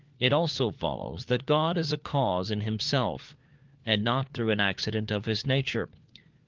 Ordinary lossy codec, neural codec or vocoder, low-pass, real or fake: Opus, 32 kbps; codec, 16 kHz, 4 kbps, FunCodec, trained on LibriTTS, 50 frames a second; 7.2 kHz; fake